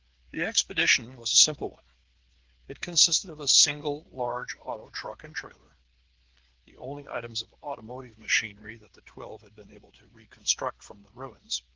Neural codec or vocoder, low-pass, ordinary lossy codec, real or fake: codec, 16 kHz, 8 kbps, FreqCodec, smaller model; 7.2 kHz; Opus, 16 kbps; fake